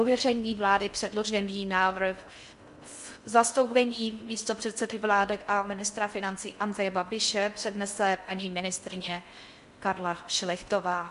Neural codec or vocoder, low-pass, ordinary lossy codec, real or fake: codec, 16 kHz in and 24 kHz out, 0.6 kbps, FocalCodec, streaming, 4096 codes; 10.8 kHz; MP3, 64 kbps; fake